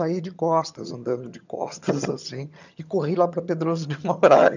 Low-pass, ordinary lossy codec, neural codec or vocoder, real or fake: 7.2 kHz; none; vocoder, 22.05 kHz, 80 mel bands, HiFi-GAN; fake